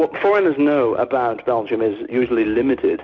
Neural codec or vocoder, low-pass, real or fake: none; 7.2 kHz; real